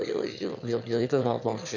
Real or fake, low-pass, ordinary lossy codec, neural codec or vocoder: fake; 7.2 kHz; none; autoencoder, 22.05 kHz, a latent of 192 numbers a frame, VITS, trained on one speaker